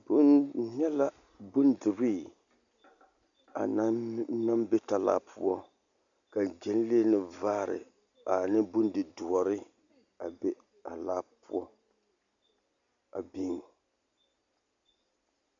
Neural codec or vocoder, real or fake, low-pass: none; real; 7.2 kHz